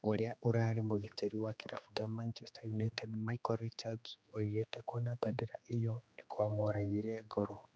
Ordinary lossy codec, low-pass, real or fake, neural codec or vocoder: none; none; fake; codec, 16 kHz, 2 kbps, X-Codec, HuBERT features, trained on general audio